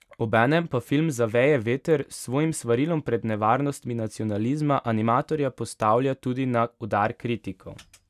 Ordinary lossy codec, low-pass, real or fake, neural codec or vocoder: none; 14.4 kHz; real; none